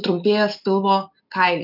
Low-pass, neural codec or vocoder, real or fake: 5.4 kHz; none; real